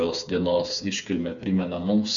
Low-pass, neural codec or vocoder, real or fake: 7.2 kHz; codec, 16 kHz, 4 kbps, FreqCodec, smaller model; fake